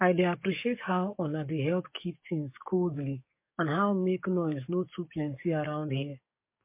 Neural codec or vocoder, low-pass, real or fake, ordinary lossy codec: codec, 44.1 kHz, 7.8 kbps, DAC; 3.6 kHz; fake; MP3, 24 kbps